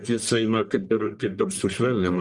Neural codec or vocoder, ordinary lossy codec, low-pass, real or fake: codec, 44.1 kHz, 1.7 kbps, Pupu-Codec; Opus, 32 kbps; 10.8 kHz; fake